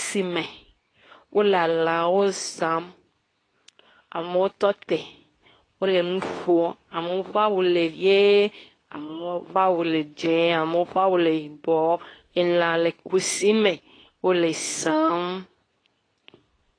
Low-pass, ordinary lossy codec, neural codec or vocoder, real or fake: 9.9 kHz; AAC, 32 kbps; codec, 24 kHz, 0.9 kbps, WavTokenizer, medium speech release version 2; fake